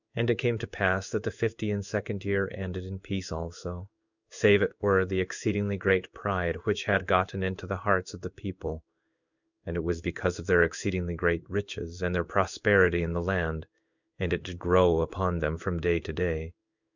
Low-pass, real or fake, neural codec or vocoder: 7.2 kHz; fake; codec, 16 kHz in and 24 kHz out, 1 kbps, XY-Tokenizer